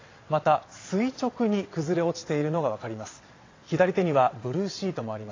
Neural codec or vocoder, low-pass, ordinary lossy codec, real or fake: vocoder, 22.05 kHz, 80 mel bands, WaveNeXt; 7.2 kHz; AAC, 32 kbps; fake